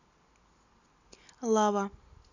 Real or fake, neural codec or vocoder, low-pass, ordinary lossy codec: real; none; 7.2 kHz; none